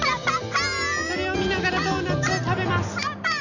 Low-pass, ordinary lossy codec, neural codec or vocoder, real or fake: 7.2 kHz; none; none; real